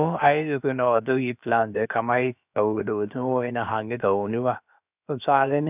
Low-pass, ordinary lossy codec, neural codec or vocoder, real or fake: 3.6 kHz; none; codec, 16 kHz, about 1 kbps, DyCAST, with the encoder's durations; fake